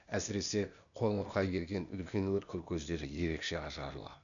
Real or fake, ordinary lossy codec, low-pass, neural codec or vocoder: fake; none; 7.2 kHz; codec, 16 kHz, 0.8 kbps, ZipCodec